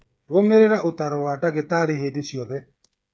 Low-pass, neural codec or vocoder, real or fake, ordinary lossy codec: none; codec, 16 kHz, 8 kbps, FreqCodec, smaller model; fake; none